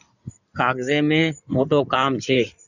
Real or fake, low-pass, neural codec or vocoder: fake; 7.2 kHz; codec, 16 kHz in and 24 kHz out, 2.2 kbps, FireRedTTS-2 codec